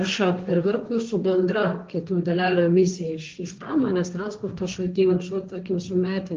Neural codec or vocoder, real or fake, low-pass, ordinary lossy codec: codec, 16 kHz, 1.1 kbps, Voila-Tokenizer; fake; 7.2 kHz; Opus, 24 kbps